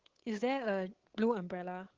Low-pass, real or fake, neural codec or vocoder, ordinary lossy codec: 7.2 kHz; fake; codec, 16 kHz, 8 kbps, FunCodec, trained on Chinese and English, 25 frames a second; Opus, 16 kbps